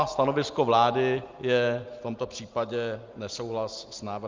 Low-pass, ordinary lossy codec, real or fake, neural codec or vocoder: 7.2 kHz; Opus, 24 kbps; real; none